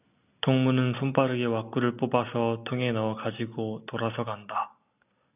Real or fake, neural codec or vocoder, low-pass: real; none; 3.6 kHz